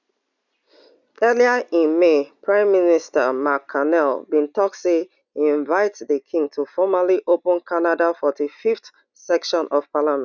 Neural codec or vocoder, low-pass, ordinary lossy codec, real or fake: none; 7.2 kHz; none; real